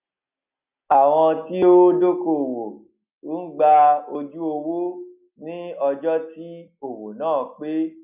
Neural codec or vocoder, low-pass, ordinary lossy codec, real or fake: none; 3.6 kHz; none; real